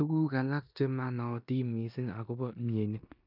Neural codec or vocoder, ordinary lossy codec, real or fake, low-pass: codec, 16 kHz in and 24 kHz out, 0.9 kbps, LongCat-Audio-Codec, four codebook decoder; none; fake; 5.4 kHz